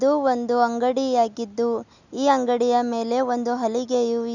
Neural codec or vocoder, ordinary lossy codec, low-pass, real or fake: none; none; 7.2 kHz; real